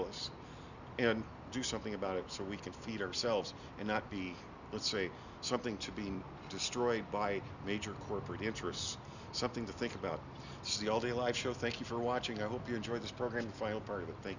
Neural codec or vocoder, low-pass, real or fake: none; 7.2 kHz; real